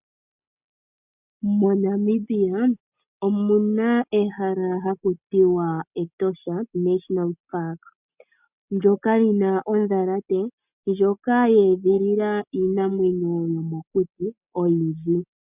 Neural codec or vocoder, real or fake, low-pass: none; real; 3.6 kHz